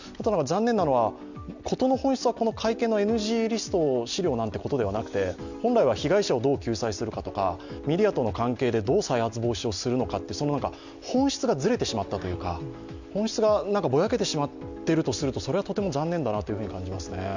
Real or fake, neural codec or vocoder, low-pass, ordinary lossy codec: real; none; 7.2 kHz; none